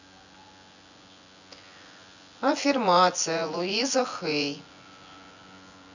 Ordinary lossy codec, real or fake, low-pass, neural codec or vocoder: none; fake; 7.2 kHz; vocoder, 24 kHz, 100 mel bands, Vocos